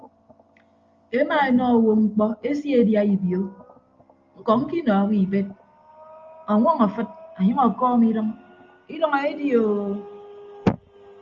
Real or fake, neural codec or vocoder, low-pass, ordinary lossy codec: real; none; 7.2 kHz; Opus, 32 kbps